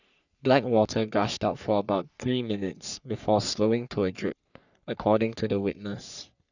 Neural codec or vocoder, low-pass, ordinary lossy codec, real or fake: codec, 44.1 kHz, 3.4 kbps, Pupu-Codec; 7.2 kHz; none; fake